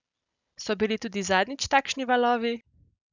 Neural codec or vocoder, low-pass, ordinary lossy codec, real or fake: none; 7.2 kHz; none; real